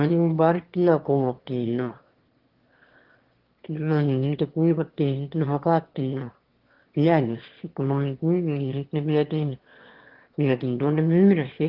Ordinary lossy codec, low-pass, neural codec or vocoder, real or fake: Opus, 16 kbps; 5.4 kHz; autoencoder, 22.05 kHz, a latent of 192 numbers a frame, VITS, trained on one speaker; fake